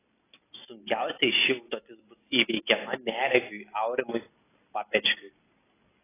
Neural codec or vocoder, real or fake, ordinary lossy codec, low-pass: none; real; AAC, 16 kbps; 3.6 kHz